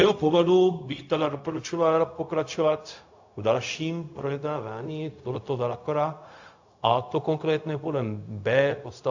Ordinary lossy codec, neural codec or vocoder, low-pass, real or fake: AAC, 48 kbps; codec, 16 kHz, 0.4 kbps, LongCat-Audio-Codec; 7.2 kHz; fake